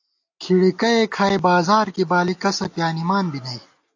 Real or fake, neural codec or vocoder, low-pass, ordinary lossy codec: real; none; 7.2 kHz; AAC, 48 kbps